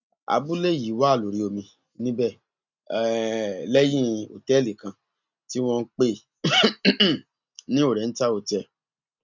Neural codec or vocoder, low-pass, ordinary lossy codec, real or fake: none; 7.2 kHz; none; real